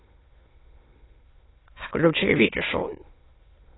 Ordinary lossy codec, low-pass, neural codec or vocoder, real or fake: AAC, 16 kbps; 7.2 kHz; autoencoder, 22.05 kHz, a latent of 192 numbers a frame, VITS, trained on many speakers; fake